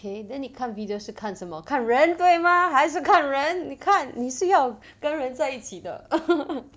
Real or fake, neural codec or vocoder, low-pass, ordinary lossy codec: real; none; none; none